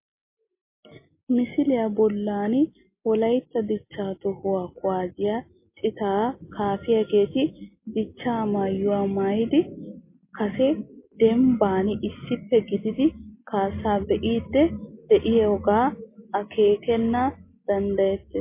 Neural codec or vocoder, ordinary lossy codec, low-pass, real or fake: none; MP3, 24 kbps; 3.6 kHz; real